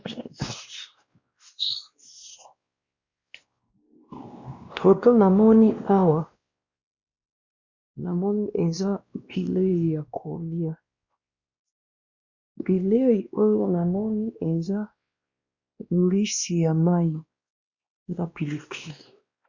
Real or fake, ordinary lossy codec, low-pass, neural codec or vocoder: fake; Opus, 64 kbps; 7.2 kHz; codec, 16 kHz, 1 kbps, X-Codec, WavLM features, trained on Multilingual LibriSpeech